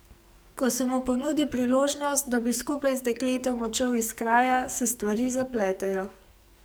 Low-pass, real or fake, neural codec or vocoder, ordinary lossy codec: none; fake; codec, 44.1 kHz, 2.6 kbps, SNAC; none